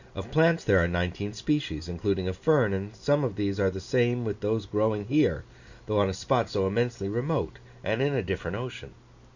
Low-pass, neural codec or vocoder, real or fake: 7.2 kHz; none; real